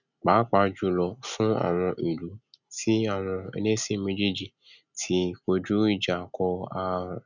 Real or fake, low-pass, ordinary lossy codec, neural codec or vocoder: real; 7.2 kHz; none; none